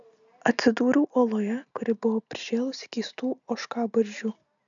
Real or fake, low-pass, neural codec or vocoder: real; 7.2 kHz; none